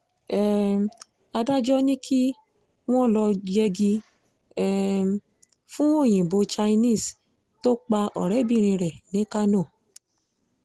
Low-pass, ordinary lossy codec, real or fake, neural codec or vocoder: 10.8 kHz; Opus, 16 kbps; real; none